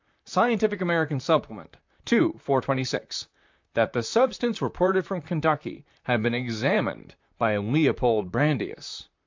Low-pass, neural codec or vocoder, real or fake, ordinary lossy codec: 7.2 kHz; vocoder, 44.1 kHz, 128 mel bands, Pupu-Vocoder; fake; MP3, 64 kbps